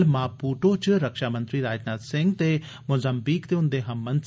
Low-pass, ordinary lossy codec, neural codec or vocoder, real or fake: none; none; none; real